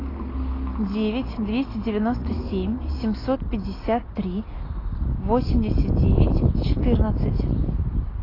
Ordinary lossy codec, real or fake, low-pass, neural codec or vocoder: AAC, 24 kbps; real; 5.4 kHz; none